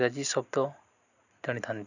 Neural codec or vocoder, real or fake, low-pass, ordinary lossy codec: none; real; 7.2 kHz; none